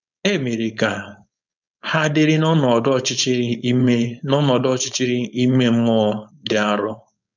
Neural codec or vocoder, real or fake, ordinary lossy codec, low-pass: codec, 16 kHz, 4.8 kbps, FACodec; fake; none; 7.2 kHz